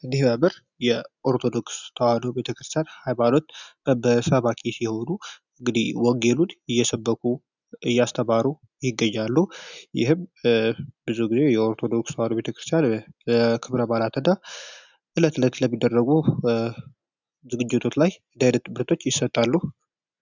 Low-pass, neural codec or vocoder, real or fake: 7.2 kHz; none; real